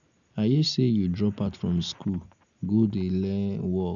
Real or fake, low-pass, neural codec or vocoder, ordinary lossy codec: real; 7.2 kHz; none; none